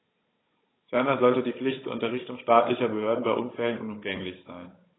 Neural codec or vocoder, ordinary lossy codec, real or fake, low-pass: codec, 16 kHz, 16 kbps, FunCodec, trained on Chinese and English, 50 frames a second; AAC, 16 kbps; fake; 7.2 kHz